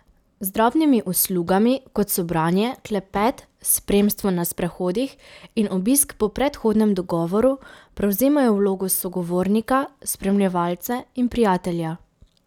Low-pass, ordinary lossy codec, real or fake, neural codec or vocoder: 19.8 kHz; none; real; none